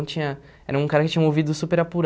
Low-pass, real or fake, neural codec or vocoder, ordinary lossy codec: none; real; none; none